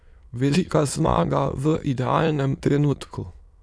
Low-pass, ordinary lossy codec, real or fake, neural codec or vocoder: none; none; fake; autoencoder, 22.05 kHz, a latent of 192 numbers a frame, VITS, trained on many speakers